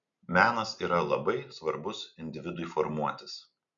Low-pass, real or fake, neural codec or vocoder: 7.2 kHz; real; none